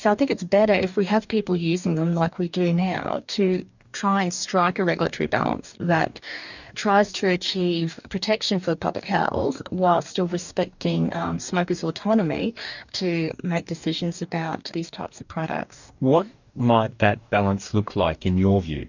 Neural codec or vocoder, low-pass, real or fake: codec, 44.1 kHz, 2.6 kbps, DAC; 7.2 kHz; fake